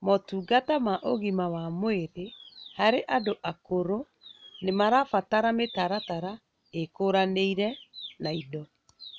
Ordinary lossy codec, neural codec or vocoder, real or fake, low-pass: none; none; real; none